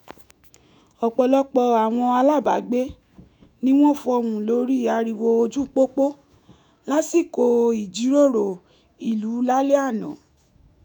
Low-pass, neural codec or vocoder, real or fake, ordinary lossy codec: 19.8 kHz; autoencoder, 48 kHz, 128 numbers a frame, DAC-VAE, trained on Japanese speech; fake; none